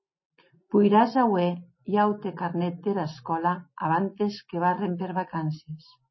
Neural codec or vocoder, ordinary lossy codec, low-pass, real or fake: none; MP3, 24 kbps; 7.2 kHz; real